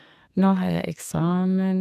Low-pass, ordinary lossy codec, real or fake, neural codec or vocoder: 14.4 kHz; none; fake; codec, 32 kHz, 1.9 kbps, SNAC